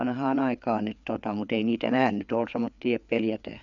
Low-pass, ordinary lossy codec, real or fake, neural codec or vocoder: 7.2 kHz; none; fake; codec, 16 kHz, 4 kbps, FreqCodec, larger model